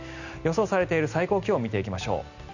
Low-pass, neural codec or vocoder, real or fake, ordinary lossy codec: 7.2 kHz; none; real; none